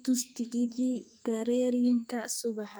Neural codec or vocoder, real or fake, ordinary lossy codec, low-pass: codec, 44.1 kHz, 2.6 kbps, SNAC; fake; none; none